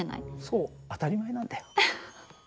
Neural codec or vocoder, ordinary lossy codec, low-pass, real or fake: none; none; none; real